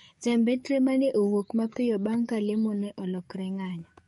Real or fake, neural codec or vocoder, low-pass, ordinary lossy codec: fake; codec, 44.1 kHz, 7.8 kbps, DAC; 19.8 kHz; MP3, 48 kbps